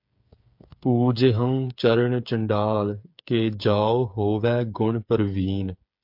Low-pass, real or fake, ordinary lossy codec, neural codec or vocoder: 5.4 kHz; fake; MP3, 48 kbps; codec, 16 kHz, 8 kbps, FreqCodec, smaller model